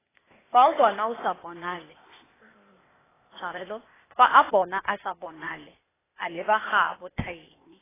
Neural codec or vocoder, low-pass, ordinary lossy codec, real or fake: codec, 16 kHz, 0.8 kbps, ZipCodec; 3.6 kHz; AAC, 16 kbps; fake